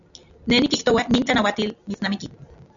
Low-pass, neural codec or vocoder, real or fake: 7.2 kHz; none; real